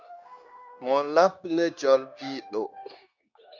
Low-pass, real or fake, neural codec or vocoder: 7.2 kHz; fake; codec, 16 kHz, 0.9 kbps, LongCat-Audio-Codec